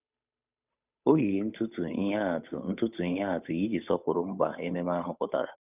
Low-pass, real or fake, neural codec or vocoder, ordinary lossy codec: 3.6 kHz; fake; codec, 16 kHz, 8 kbps, FunCodec, trained on Chinese and English, 25 frames a second; none